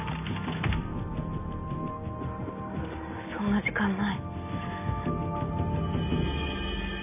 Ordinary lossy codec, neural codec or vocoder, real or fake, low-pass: none; none; real; 3.6 kHz